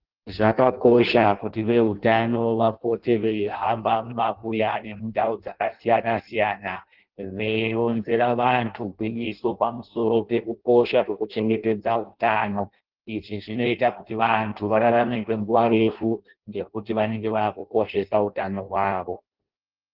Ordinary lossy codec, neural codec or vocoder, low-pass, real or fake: Opus, 16 kbps; codec, 16 kHz in and 24 kHz out, 0.6 kbps, FireRedTTS-2 codec; 5.4 kHz; fake